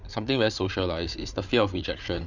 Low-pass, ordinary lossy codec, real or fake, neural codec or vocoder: 7.2 kHz; none; fake; codec, 16 kHz, 8 kbps, FreqCodec, larger model